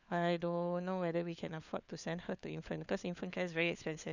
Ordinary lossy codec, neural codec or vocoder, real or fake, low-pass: none; codec, 16 kHz, 4 kbps, FunCodec, trained on LibriTTS, 50 frames a second; fake; 7.2 kHz